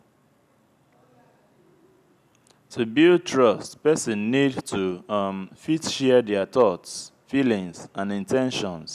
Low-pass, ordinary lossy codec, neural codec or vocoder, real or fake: 14.4 kHz; none; none; real